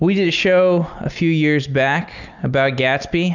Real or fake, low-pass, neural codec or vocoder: real; 7.2 kHz; none